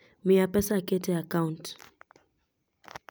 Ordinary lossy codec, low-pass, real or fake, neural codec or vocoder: none; none; real; none